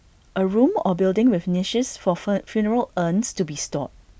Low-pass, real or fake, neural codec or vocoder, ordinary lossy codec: none; real; none; none